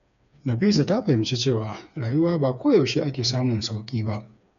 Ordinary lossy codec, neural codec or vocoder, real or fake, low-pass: none; codec, 16 kHz, 4 kbps, FreqCodec, smaller model; fake; 7.2 kHz